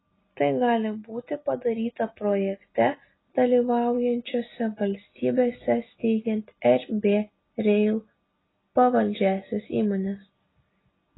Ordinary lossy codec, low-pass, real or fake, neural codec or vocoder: AAC, 16 kbps; 7.2 kHz; real; none